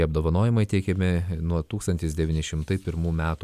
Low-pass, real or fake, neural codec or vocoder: 14.4 kHz; real; none